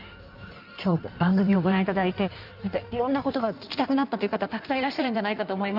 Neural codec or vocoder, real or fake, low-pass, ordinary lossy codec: codec, 16 kHz in and 24 kHz out, 1.1 kbps, FireRedTTS-2 codec; fake; 5.4 kHz; none